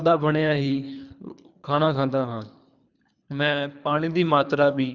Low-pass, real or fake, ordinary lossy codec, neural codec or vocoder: 7.2 kHz; fake; none; codec, 24 kHz, 3 kbps, HILCodec